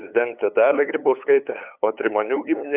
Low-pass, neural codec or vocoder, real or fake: 3.6 kHz; codec, 16 kHz, 8 kbps, FunCodec, trained on LibriTTS, 25 frames a second; fake